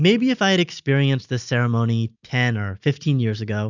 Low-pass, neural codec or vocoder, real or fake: 7.2 kHz; none; real